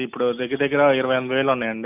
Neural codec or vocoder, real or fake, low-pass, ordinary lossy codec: none; real; 3.6 kHz; none